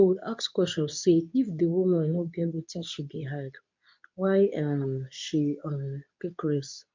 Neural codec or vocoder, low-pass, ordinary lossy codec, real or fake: codec, 24 kHz, 0.9 kbps, WavTokenizer, medium speech release version 2; 7.2 kHz; MP3, 64 kbps; fake